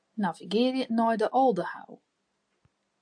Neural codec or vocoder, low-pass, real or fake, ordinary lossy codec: none; 9.9 kHz; real; AAC, 64 kbps